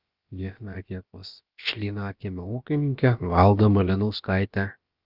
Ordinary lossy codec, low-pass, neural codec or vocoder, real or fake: Opus, 24 kbps; 5.4 kHz; codec, 16 kHz, about 1 kbps, DyCAST, with the encoder's durations; fake